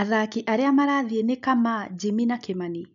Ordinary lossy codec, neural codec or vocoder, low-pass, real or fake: none; none; 7.2 kHz; real